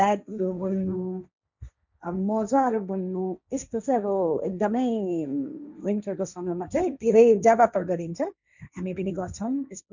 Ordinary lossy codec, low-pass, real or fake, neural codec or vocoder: none; 7.2 kHz; fake; codec, 16 kHz, 1.1 kbps, Voila-Tokenizer